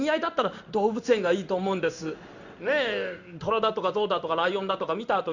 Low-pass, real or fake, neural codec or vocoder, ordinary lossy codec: 7.2 kHz; fake; codec, 16 kHz in and 24 kHz out, 1 kbps, XY-Tokenizer; Opus, 64 kbps